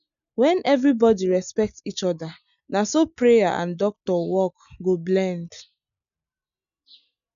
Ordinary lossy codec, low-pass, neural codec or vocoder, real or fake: none; 7.2 kHz; none; real